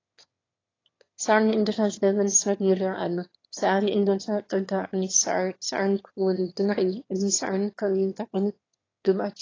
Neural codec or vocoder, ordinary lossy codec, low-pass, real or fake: autoencoder, 22.05 kHz, a latent of 192 numbers a frame, VITS, trained on one speaker; AAC, 32 kbps; 7.2 kHz; fake